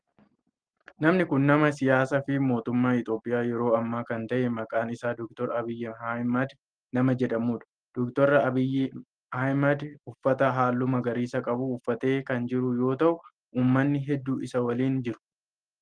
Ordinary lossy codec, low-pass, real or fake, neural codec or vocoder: Opus, 24 kbps; 9.9 kHz; real; none